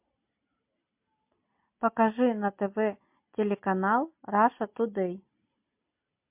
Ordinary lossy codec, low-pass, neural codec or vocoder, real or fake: MP3, 32 kbps; 3.6 kHz; none; real